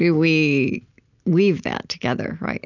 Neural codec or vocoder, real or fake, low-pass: none; real; 7.2 kHz